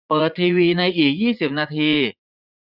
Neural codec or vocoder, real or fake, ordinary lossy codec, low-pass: vocoder, 44.1 kHz, 80 mel bands, Vocos; fake; none; 5.4 kHz